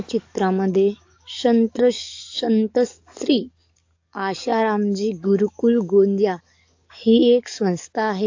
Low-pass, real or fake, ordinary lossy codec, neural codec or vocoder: 7.2 kHz; fake; AAC, 48 kbps; codec, 44.1 kHz, 7.8 kbps, DAC